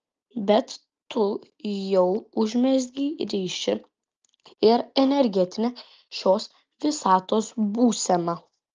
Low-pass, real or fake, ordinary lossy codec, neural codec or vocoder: 7.2 kHz; real; Opus, 24 kbps; none